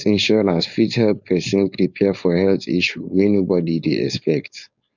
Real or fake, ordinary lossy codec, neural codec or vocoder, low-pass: fake; none; codec, 16 kHz, 4.8 kbps, FACodec; 7.2 kHz